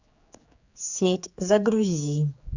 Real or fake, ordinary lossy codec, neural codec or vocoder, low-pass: fake; Opus, 64 kbps; codec, 16 kHz, 4 kbps, X-Codec, HuBERT features, trained on general audio; 7.2 kHz